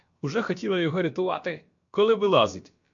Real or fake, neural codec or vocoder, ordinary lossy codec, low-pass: fake; codec, 16 kHz, about 1 kbps, DyCAST, with the encoder's durations; MP3, 48 kbps; 7.2 kHz